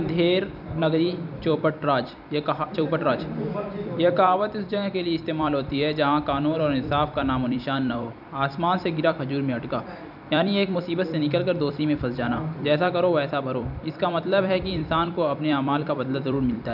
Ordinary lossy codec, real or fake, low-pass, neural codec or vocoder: none; fake; 5.4 kHz; vocoder, 44.1 kHz, 128 mel bands every 256 samples, BigVGAN v2